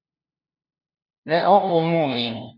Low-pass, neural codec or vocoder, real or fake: 5.4 kHz; codec, 16 kHz, 0.5 kbps, FunCodec, trained on LibriTTS, 25 frames a second; fake